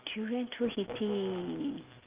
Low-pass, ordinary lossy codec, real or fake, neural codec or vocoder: 3.6 kHz; Opus, 24 kbps; real; none